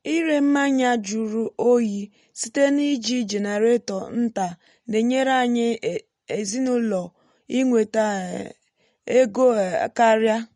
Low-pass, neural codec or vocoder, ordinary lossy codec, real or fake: 19.8 kHz; none; MP3, 48 kbps; real